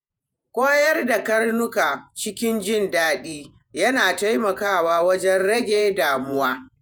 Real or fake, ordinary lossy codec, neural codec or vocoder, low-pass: fake; none; vocoder, 48 kHz, 128 mel bands, Vocos; none